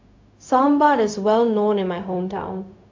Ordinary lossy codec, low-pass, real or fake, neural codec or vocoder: AAC, 48 kbps; 7.2 kHz; fake; codec, 16 kHz, 0.4 kbps, LongCat-Audio-Codec